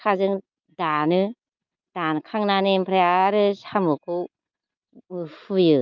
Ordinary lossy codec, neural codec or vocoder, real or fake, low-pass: Opus, 24 kbps; none; real; 7.2 kHz